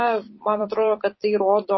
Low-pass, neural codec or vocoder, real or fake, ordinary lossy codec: 7.2 kHz; vocoder, 44.1 kHz, 128 mel bands every 256 samples, BigVGAN v2; fake; MP3, 24 kbps